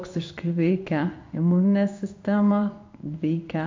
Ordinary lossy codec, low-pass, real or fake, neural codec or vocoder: MP3, 64 kbps; 7.2 kHz; fake; codec, 16 kHz in and 24 kHz out, 1 kbps, XY-Tokenizer